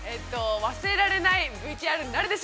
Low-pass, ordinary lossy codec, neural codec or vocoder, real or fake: none; none; none; real